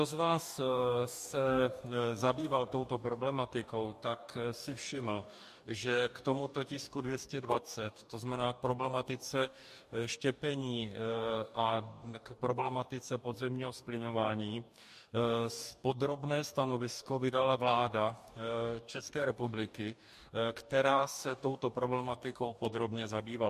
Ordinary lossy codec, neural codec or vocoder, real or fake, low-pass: MP3, 64 kbps; codec, 44.1 kHz, 2.6 kbps, DAC; fake; 14.4 kHz